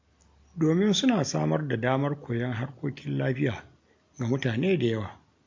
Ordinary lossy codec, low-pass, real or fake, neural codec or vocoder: MP3, 48 kbps; 7.2 kHz; real; none